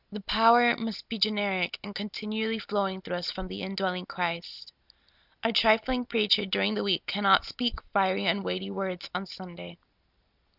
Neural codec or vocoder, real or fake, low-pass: none; real; 5.4 kHz